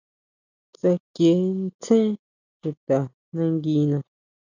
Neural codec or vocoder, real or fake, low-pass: none; real; 7.2 kHz